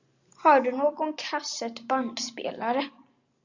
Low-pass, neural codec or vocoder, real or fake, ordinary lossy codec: 7.2 kHz; none; real; Opus, 64 kbps